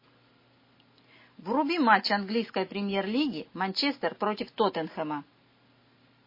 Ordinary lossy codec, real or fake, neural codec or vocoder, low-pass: MP3, 24 kbps; real; none; 5.4 kHz